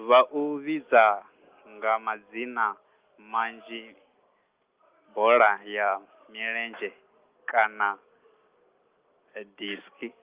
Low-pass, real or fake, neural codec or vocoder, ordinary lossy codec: 3.6 kHz; real; none; Opus, 32 kbps